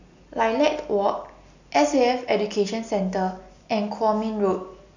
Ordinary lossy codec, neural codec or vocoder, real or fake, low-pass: none; none; real; 7.2 kHz